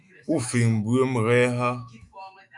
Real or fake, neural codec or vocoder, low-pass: fake; autoencoder, 48 kHz, 128 numbers a frame, DAC-VAE, trained on Japanese speech; 10.8 kHz